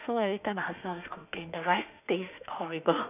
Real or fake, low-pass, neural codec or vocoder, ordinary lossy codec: fake; 3.6 kHz; autoencoder, 48 kHz, 32 numbers a frame, DAC-VAE, trained on Japanese speech; none